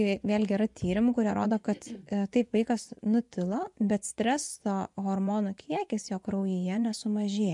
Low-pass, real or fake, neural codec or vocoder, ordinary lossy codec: 10.8 kHz; fake; vocoder, 24 kHz, 100 mel bands, Vocos; MP3, 64 kbps